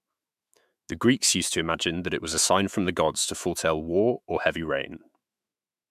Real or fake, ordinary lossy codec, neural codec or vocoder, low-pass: fake; MP3, 96 kbps; autoencoder, 48 kHz, 128 numbers a frame, DAC-VAE, trained on Japanese speech; 14.4 kHz